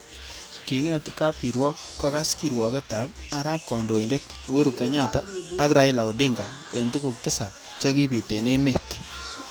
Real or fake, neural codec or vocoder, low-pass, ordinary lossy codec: fake; codec, 44.1 kHz, 2.6 kbps, DAC; none; none